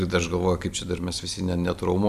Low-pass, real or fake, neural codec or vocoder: 14.4 kHz; real; none